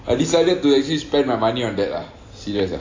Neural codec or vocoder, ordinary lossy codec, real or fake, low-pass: none; AAC, 32 kbps; real; 7.2 kHz